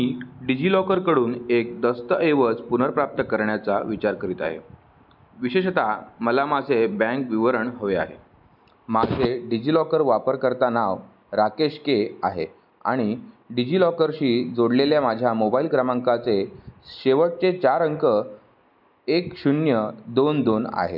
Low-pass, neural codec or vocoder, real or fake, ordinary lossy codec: 5.4 kHz; none; real; none